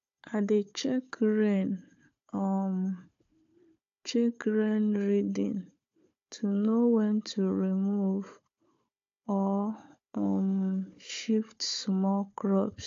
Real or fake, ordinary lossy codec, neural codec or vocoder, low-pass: fake; AAC, 48 kbps; codec, 16 kHz, 4 kbps, FunCodec, trained on Chinese and English, 50 frames a second; 7.2 kHz